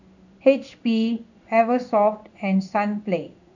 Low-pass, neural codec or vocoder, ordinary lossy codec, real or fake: 7.2 kHz; codec, 16 kHz in and 24 kHz out, 1 kbps, XY-Tokenizer; none; fake